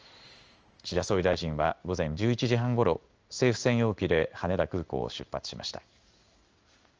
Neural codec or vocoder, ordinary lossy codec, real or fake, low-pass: codec, 16 kHz in and 24 kHz out, 1 kbps, XY-Tokenizer; Opus, 24 kbps; fake; 7.2 kHz